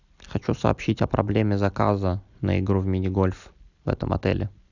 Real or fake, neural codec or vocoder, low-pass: real; none; 7.2 kHz